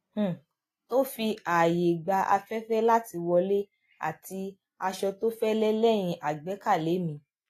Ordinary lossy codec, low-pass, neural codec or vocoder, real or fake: AAC, 48 kbps; 14.4 kHz; none; real